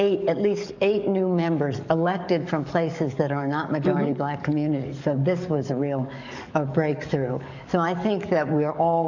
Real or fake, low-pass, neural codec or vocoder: fake; 7.2 kHz; codec, 44.1 kHz, 7.8 kbps, DAC